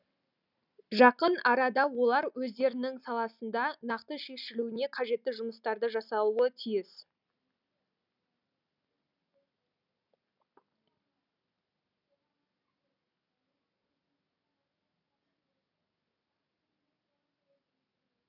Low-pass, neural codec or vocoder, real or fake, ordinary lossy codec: 5.4 kHz; none; real; none